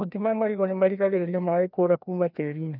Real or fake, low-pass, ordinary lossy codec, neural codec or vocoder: fake; 5.4 kHz; none; codec, 16 kHz, 1 kbps, FreqCodec, larger model